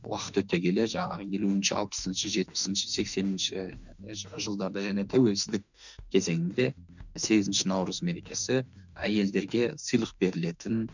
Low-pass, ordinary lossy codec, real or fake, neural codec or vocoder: 7.2 kHz; none; fake; autoencoder, 48 kHz, 32 numbers a frame, DAC-VAE, trained on Japanese speech